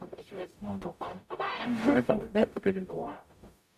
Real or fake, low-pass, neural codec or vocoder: fake; 14.4 kHz; codec, 44.1 kHz, 0.9 kbps, DAC